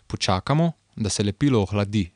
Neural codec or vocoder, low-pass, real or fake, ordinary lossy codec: none; 9.9 kHz; real; none